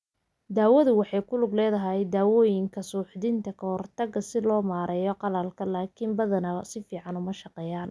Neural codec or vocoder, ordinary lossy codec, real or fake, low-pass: none; none; real; none